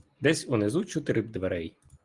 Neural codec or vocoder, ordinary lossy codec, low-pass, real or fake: none; Opus, 24 kbps; 10.8 kHz; real